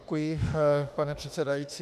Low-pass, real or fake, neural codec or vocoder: 14.4 kHz; fake; autoencoder, 48 kHz, 32 numbers a frame, DAC-VAE, trained on Japanese speech